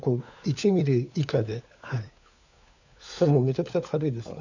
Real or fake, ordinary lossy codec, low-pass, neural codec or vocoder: fake; none; 7.2 kHz; codec, 16 kHz, 4 kbps, FunCodec, trained on LibriTTS, 50 frames a second